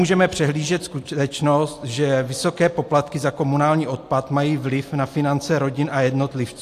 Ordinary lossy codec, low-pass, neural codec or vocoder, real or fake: AAC, 64 kbps; 14.4 kHz; none; real